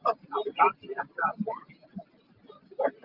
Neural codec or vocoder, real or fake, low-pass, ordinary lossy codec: none; real; 5.4 kHz; Opus, 32 kbps